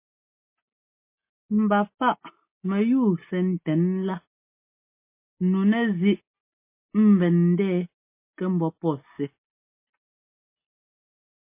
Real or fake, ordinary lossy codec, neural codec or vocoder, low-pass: real; MP3, 24 kbps; none; 3.6 kHz